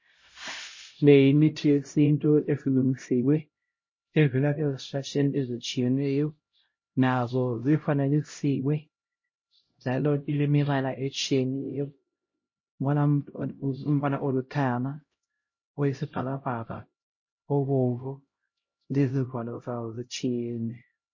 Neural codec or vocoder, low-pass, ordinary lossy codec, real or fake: codec, 16 kHz, 0.5 kbps, X-Codec, HuBERT features, trained on LibriSpeech; 7.2 kHz; MP3, 32 kbps; fake